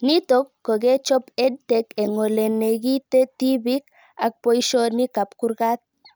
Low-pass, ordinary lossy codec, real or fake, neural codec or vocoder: none; none; real; none